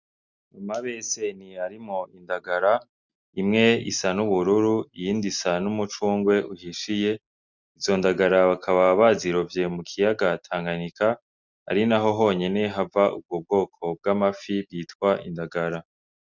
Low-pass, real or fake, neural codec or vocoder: 7.2 kHz; real; none